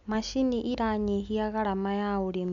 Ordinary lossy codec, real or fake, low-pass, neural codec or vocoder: none; real; 7.2 kHz; none